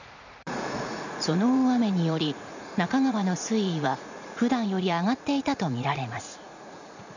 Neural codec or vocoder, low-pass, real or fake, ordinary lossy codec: vocoder, 44.1 kHz, 80 mel bands, Vocos; 7.2 kHz; fake; none